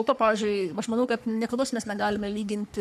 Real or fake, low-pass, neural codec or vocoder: fake; 14.4 kHz; codec, 44.1 kHz, 3.4 kbps, Pupu-Codec